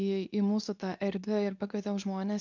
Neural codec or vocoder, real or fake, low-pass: codec, 24 kHz, 0.9 kbps, WavTokenizer, medium speech release version 2; fake; 7.2 kHz